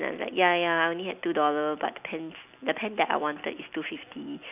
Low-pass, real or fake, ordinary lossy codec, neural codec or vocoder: 3.6 kHz; real; none; none